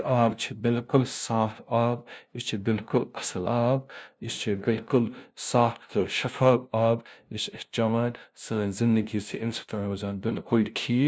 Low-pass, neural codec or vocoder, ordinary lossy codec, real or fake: none; codec, 16 kHz, 0.5 kbps, FunCodec, trained on LibriTTS, 25 frames a second; none; fake